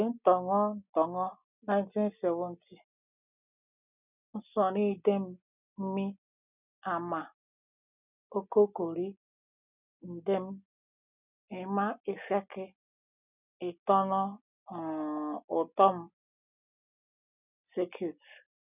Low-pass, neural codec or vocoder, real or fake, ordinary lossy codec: 3.6 kHz; none; real; none